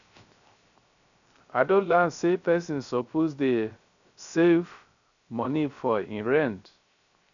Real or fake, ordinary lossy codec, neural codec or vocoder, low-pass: fake; none; codec, 16 kHz, 0.3 kbps, FocalCodec; 7.2 kHz